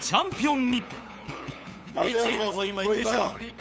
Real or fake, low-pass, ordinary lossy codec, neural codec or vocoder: fake; none; none; codec, 16 kHz, 8 kbps, FunCodec, trained on LibriTTS, 25 frames a second